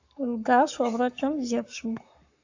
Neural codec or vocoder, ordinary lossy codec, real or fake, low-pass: codec, 44.1 kHz, 7.8 kbps, Pupu-Codec; AAC, 48 kbps; fake; 7.2 kHz